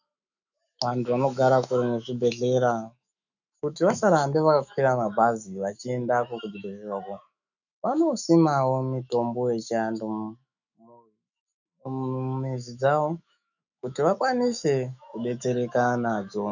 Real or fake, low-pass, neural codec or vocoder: fake; 7.2 kHz; autoencoder, 48 kHz, 128 numbers a frame, DAC-VAE, trained on Japanese speech